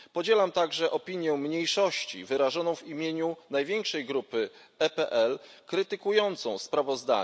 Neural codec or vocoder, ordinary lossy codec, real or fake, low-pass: none; none; real; none